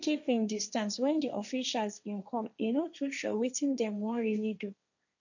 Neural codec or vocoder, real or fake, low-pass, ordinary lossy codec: codec, 16 kHz, 1.1 kbps, Voila-Tokenizer; fake; 7.2 kHz; none